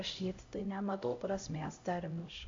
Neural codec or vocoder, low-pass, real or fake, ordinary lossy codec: codec, 16 kHz, 0.5 kbps, X-Codec, HuBERT features, trained on LibriSpeech; 7.2 kHz; fake; MP3, 48 kbps